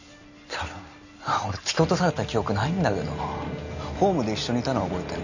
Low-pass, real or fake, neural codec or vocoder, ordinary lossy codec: 7.2 kHz; real; none; none